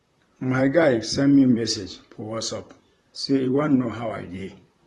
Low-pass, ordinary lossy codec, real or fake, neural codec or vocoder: 19.8 kHz; AAC, 32 kbps; fake; vocoder, 44.1 kHz, 128 mel bands, Pupu-Vocoder